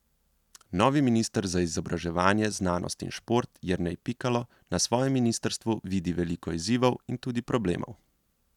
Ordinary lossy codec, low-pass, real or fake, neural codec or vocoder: none; 19.8 kHz; real; none